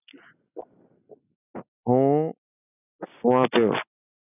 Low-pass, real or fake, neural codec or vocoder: 3.6 kHz; real; none